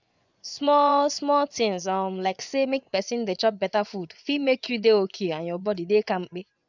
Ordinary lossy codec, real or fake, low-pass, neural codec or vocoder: none; fake; 7.2 kHz; vocoder, 24 kHz, 100 mel bands, Vocos